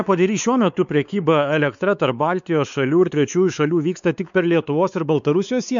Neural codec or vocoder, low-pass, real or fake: codec, 16 kHz, 4 kbps, X-Codec, WavLM features, trained on Multilingual LibriSpeech; 7.2 kHz; fake